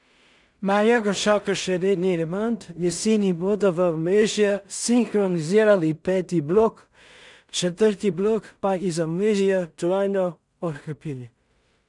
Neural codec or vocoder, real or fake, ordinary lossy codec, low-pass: codec, 16 kHz in and 24 kHz out, 0.4 kbps, LongCat-Audio-Codec, two codebook decoder; fake; none; 10.8 kHz